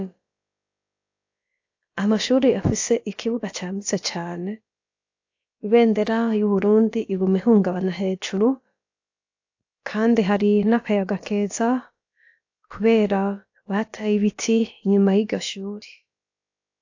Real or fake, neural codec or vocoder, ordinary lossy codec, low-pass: fake; codec, 16 kHz, about 1 kbps, DyCAST, with the encoder's durations; AAC, 48 kbps; 7.2 kHz